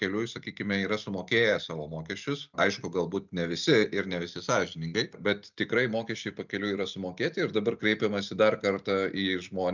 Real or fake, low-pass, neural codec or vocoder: real; 7.2 kHz; none